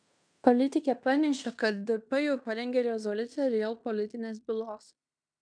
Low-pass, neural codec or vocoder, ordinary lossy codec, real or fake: 9.9 kHz; codec, 16 kHz in and 24 kHz out, 0.9 kbps, LongCat-Audio-Codec, fine tuned four codebook decoder; MP3, 96 kbps; fake